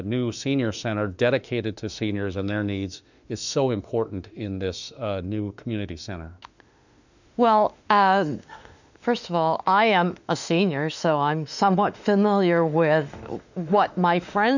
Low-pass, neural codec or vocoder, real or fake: 7.2 kHz; autoencoder, 48 kHz, 32 numbers a frame, DAC-VAE, trained on Japanese speech; fake